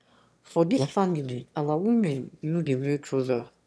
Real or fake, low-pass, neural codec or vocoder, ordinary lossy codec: fake; none; autoencoder, 22.05 kHz, a latent of 192 numbers a frame, VITS, trained on one speaker; none